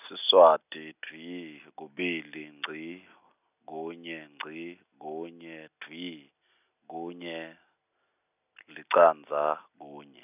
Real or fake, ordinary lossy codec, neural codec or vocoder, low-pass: real; none; none; 3.6 kHz